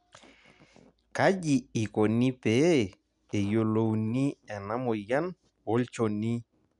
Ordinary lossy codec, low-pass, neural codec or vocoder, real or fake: none; 10.8 kHz; none; real